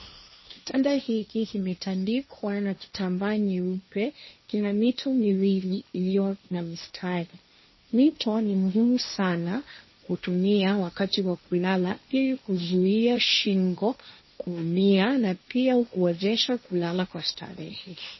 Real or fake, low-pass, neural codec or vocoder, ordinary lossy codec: fake; 7.2 kHz; codec, 16 kHz, 1.1 kbps, Voila-Tokenizer; MP3, 24 kbps